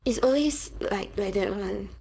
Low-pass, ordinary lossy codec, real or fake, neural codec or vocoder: none; none; fake; codec, 16 kHz, 4.8 kbps, FACodec